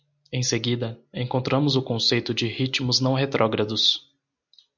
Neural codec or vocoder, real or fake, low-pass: none; real; 7.2 kHz